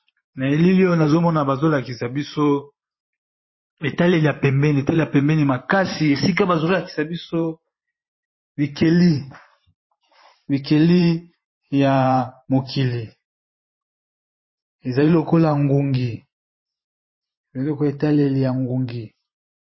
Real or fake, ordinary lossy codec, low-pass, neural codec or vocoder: fake; MP3, 24 kbps; 7.2 kHz; vocoder, 44.1 kHz, 128 mel bands every 512 samples, BigVGAN v2